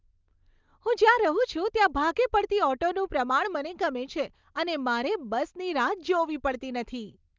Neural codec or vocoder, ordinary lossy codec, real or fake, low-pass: none; Opus, 32 kbps; real; 7.2 kHz